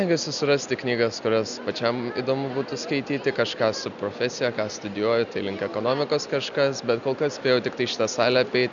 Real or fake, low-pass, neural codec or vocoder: real; 7.2 kHz; none